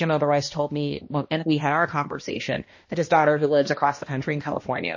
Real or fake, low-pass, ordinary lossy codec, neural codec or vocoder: fake; 7.2 kHz; MP3, 32 kbps; codec, 16 kHz, 1 kbps, X-Codec, HuBERT features, trained on balanced general audio